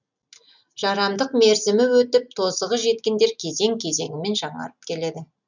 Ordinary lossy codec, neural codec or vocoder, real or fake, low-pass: none; none; real; 7.2 kHz